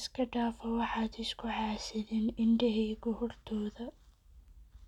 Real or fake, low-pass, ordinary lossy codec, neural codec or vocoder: real; 19.8 kHz; none; none